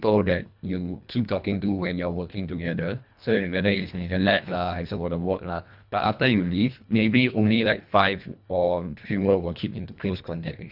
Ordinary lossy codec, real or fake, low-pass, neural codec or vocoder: none; fake; 5.4 kHz; codec, 24 kHz, 1.5 kbps, HILCodec